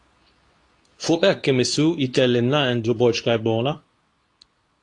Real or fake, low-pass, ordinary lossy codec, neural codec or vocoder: fake; 10.8 kHz; AAC, 48 kbps; codec, 24 kHz, 0.9 kbps, WavTokenizer, medium speech release version 2